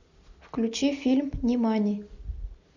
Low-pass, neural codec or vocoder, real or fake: 7.2 kHz; none; real